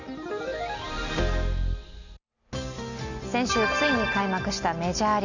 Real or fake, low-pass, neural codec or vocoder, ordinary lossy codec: real; 7.2 kHz; none; none